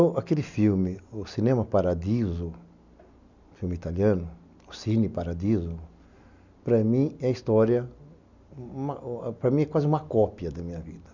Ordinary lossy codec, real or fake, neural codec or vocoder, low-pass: none; real; none; 7.2 kHz